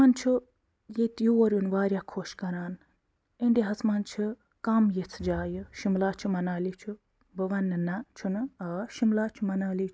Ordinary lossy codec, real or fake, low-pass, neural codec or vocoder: none; real; none; none